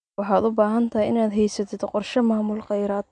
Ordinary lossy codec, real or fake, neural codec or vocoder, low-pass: none; real; none; 9.9 kHz